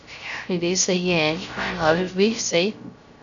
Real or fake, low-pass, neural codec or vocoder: fake; 7.2 kHz; codec, 16 kHz, 0.3 kbps, FocalCodec